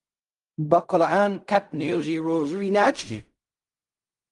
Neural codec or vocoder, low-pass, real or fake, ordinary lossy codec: codec, 16 kHz in and 24 kHz out, 0.4 kbps, LongCat-Audio-Codec, fine tuned four codebook decoder; 10.8 kHz; fake; Opus, 32 kbps